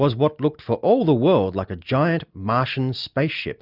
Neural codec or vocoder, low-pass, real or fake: none; 5.4 kHz; real